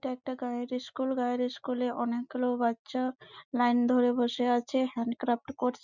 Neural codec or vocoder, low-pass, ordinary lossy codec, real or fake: none; 7.2 kHz; none; real